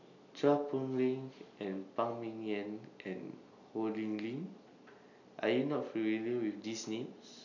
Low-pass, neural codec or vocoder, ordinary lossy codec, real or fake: 7.2 kHz; none; none; real